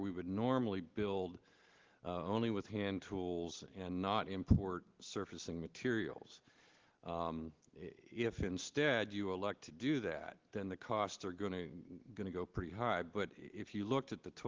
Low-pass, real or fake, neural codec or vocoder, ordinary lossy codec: 7.2 kHz; real; none; Opus, 16 kbps